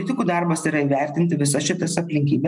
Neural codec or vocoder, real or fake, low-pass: none; real; 10.8 kHz